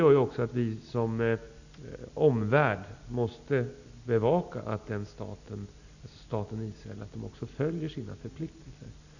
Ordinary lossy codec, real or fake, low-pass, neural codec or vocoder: none; real; 7.2 kHz; none